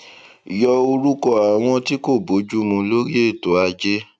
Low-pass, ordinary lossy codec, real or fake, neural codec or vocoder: 9.9 kHz; none; real; none